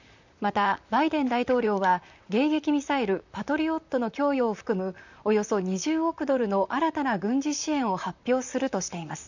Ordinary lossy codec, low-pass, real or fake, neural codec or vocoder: none; 7.2 kHz; fake; vocoder, 44.1 kHz, 128 mel bands, Pupu-Vocoder